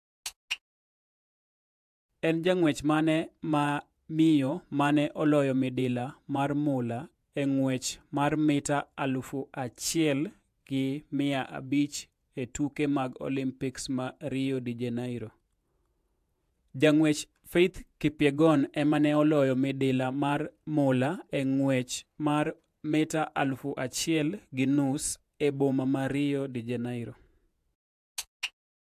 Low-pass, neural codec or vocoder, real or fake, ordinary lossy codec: 14.4 kHz; vocoder, 48 kHz, 128 mel bands, Vocos; fake; AAC, 96 kbps